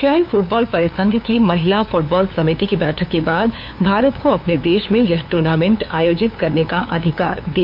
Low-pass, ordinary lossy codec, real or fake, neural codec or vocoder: 5.4 kHz; none; fake; codec, 16 kHz, 2 kbps, FunCodec, trained on LibriTTS, 25 frames a second